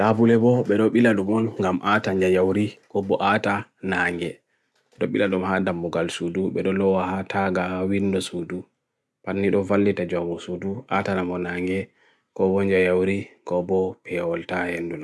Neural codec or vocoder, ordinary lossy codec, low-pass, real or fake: none; none; none; real